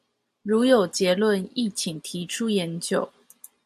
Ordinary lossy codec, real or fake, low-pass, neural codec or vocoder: AAC, 96 kbps; real; 14.4 kHz; none